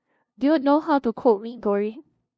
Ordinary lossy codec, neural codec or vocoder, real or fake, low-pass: none; codec, 16 kHz, 0.5 kbps, FunCodec, trained on LibriTTS, 25 frames a second; fake; none